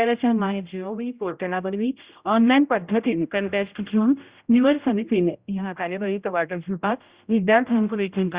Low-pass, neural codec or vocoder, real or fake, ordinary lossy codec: 3.6 kHz; codec, 16 kHz, 0.5 kbps, X-Codec, HuBERT features, trained on general audio; fake; Opus, 64 kbps